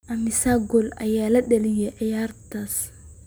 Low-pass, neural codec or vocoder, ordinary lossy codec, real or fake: none; none; none; real